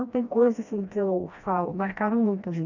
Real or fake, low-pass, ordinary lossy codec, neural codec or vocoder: fake; 7.2 kHz; none; codec, 16 kHz, 1 kbps, FreqCodec, smaller model